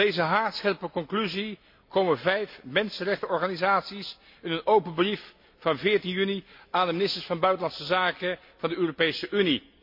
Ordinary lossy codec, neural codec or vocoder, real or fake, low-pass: MP3, 32 kbps; none; real; 5.4 kHz